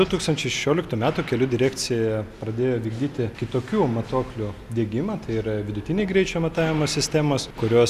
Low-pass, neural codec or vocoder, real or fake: 14.4 kHz; none; real